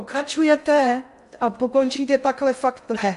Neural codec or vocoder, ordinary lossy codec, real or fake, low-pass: codec, 16 kHz in and 24 kHz out, 0.6 kbps, FocalCodec, streaming, 2048 codes; MP3, 64 kbps; fake; 10.8 kHz